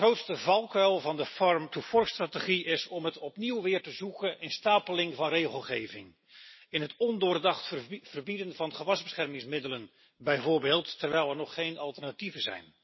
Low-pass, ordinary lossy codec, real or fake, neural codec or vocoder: 7.2 kHz; MP3, 24 kbps; real; none